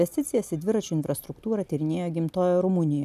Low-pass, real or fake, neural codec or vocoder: 14.4 kHz; real; none